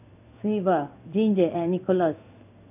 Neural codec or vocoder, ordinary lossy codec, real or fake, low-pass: codec, 16 kHz in and 24 kHz out, 1 kbps, XY-Tokenizer; none; fake; 3.6 kHz